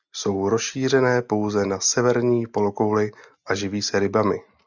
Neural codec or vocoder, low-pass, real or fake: none; 7.2 kHz; real